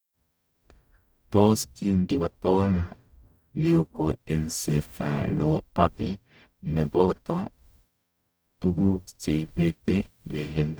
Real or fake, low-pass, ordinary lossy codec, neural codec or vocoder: fake; none; none; codec, 44.1 kHz, 0.9 kbps, DAC